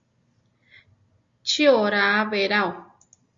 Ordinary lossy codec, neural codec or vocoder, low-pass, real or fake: Opus, 64 kbps; none; 7.2 kHz; real